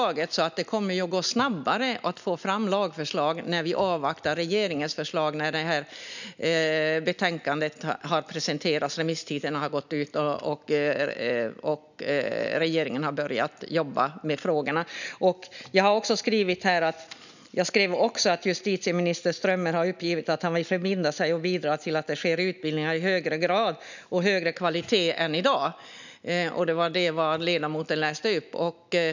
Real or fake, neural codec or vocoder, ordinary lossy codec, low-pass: real; none; none; 7.2 kHz